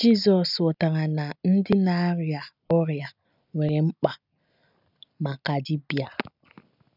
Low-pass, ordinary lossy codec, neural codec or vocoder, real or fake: 5.4 kHz; none; none; real